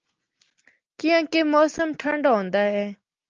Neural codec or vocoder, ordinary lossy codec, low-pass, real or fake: none; Opus, 24 kbps; 7.2 kHz; real